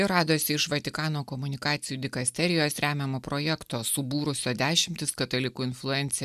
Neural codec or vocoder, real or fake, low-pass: none; real; 14.4 kHz